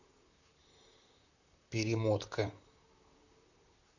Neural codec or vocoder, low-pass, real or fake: vocoder, 44.1 kHz, 128 mel bands, Pupu-Vocoder; 7.2 kHz; fake